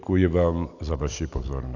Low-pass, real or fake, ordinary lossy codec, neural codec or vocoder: 7.2 kHz; real; AAC, 48 kbps; none